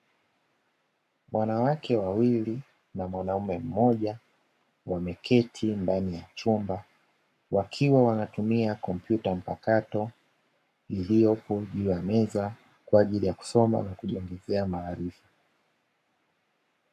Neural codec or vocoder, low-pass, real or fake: codec, 44.1 kHz, 7.8 kbps, Pupu-Codec; 14.4 kHz; fake